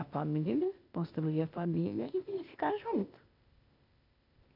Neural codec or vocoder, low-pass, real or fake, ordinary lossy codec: codec, 16 kHz, 0.8 kbps, ZipCodec; 5.4 kHz; fake; none